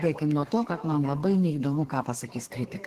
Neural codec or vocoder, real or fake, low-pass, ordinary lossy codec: codec, 32 kHz, 1.9 kbps, SNAC; fake; 14.4 kHz; Opus, 16 kbps